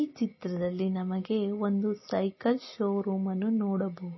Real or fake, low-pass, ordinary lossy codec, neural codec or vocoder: real; 7.2 kHz; MP3, 24 kbps; none